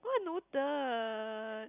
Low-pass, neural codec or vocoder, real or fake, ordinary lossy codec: 3.6 kHz; none; real; none